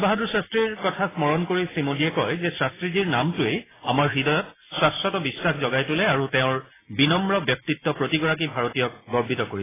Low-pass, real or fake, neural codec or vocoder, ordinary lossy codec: 3.6 kHz; real; none; AAC, 16 kbps